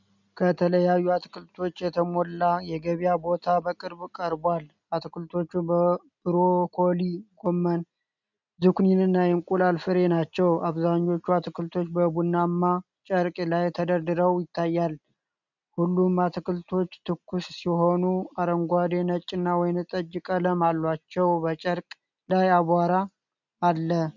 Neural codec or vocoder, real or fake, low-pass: none; real; 7.2 kHz